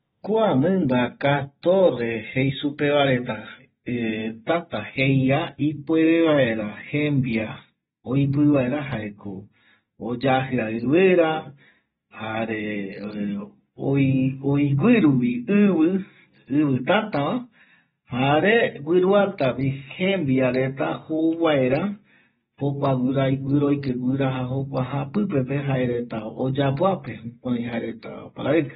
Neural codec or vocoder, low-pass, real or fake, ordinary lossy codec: none; 19.8 kHz; real; AAC, 16 kbps